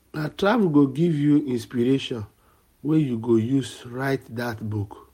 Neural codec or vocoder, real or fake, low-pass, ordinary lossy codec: none; real; 19.8 kHz; MP3, 64 kbps